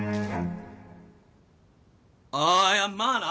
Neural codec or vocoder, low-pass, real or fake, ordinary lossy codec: none; none; real; none